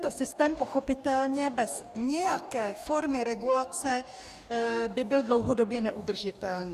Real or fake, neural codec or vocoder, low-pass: fake; codec, 44.1 kHz, 2.6 kbps, DAC; 14.4 kHz